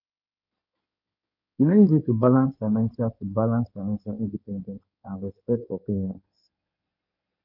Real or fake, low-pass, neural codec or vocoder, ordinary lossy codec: fake; 5.4 kHz; codec, 16 kHz in and 24 kHz out, 2.2 kbps, FireRedTTS-2 codec; none